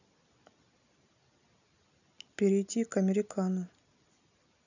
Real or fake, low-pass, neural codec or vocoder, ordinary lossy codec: real; 7.2 kHz; none; none